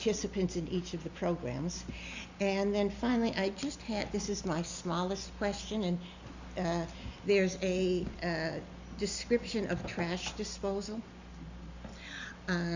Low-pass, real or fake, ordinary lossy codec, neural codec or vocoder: 7.2 kHz; real; Opus, 64 kbps; none